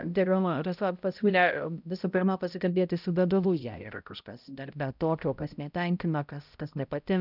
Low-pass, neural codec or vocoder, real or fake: 5.4 kHz; codec, 16 kHz, 0.5 kbps, X-Codec, HuBERT features, trained on balanced general audio; fake